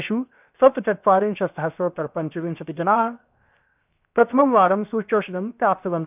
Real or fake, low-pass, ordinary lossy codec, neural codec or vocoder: fake; 3.6 kHz; none; codec, 16 kHz, 0.7 kbps, FocalCodec